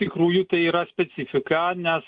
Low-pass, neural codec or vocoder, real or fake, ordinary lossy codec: 9.9 kHz; none; real; Opus, 24 kbps